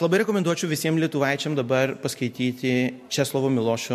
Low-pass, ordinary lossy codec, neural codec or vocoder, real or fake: 14.4 kHz; MP3, 64 kbps; none; real